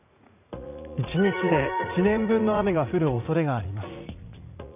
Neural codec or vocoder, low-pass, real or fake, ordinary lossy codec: vocoder, 44.1 kHz, 80 mel bands, Vocos; 3.6 kHz; fake; none